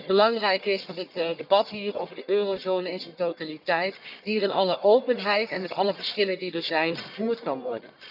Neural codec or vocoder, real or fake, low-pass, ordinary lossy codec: codec, 44.1 kHz, 1.7 kbps, Pupu-Codec; fake; 5.4 kHz; none